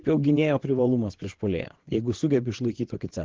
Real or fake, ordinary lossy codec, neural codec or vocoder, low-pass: fake; Opus, 16 kbps; vocoder, 22.05 kHz, 80 mel bands, WaveNeXt; 7.2 kHz